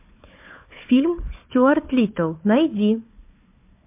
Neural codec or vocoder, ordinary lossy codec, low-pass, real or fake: codec, 16 kHz, 4 kbps, FunCodec, trained on Chinese and English, 50 frames a second; MP3, 32 kbps; 3.6 kHz; fake